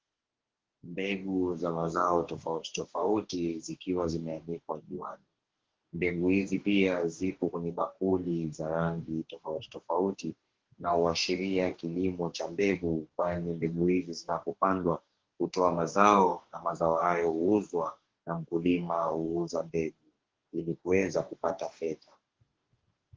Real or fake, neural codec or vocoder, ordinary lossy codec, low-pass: fake; codec, 44.1 kHz, 2.6 kbps, DAC; Opus, 16 kbps; 7.2 kHz